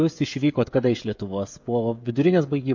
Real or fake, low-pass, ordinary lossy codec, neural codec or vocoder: fake; 7.2 kHz; MP3, 48 kbps; codec, 16 kHz, 16 kbps, FreqCodec, smaller model